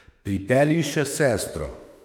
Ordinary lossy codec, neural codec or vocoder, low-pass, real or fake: none; autoencoder, 48 kHz, 32 numbers a frame, DAC-VAE, trained on Japanese speech; 19.8 kHz; fake